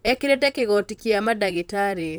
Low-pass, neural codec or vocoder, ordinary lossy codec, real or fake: none; vocoder, 44.1 kHz, 128 mel bands, Pupu-Vocoder; none; fake